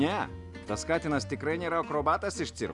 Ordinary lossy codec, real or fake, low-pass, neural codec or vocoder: Opus, 64 kbps; real; 10.8 kHz; none